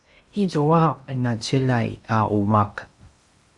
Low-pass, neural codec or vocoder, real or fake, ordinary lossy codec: 10.8 kHz; codec, 16 kHz in and 24 kHz out, 0.8 kbps, FocalCodec, streaming, 65536 codes; fake; Opus, 64 kbps